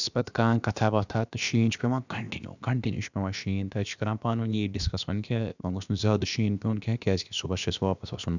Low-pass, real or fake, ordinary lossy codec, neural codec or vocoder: 7.2 kHz; fake; none; codec, 16 kHz, about 1 kbps, DyCAST, with the encoder's durations